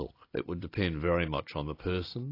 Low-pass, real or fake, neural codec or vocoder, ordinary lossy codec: 5.4 kHz; real; none; AAC, 32 kbps